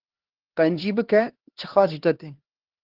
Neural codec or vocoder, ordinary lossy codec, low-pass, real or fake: codec, 16 kHz, 2 kbps, X-Codec, HuBERT features, trained on LibriSpeech; Opus, 16 kbps; 5.4 kHz; fake